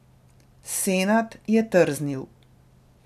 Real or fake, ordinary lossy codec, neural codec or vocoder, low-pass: real; none; none; 14.4 kHz